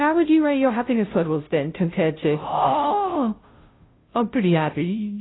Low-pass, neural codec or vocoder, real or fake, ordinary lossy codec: 7.2 kHz; codec, 16 kHz, 0.5 kbps, FunCodec, trained on Chinese and English, 25 frames a second; fake; AAC, 16 kbps